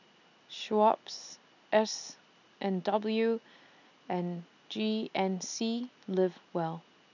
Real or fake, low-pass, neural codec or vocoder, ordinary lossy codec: real; 7.2 kHz; none; none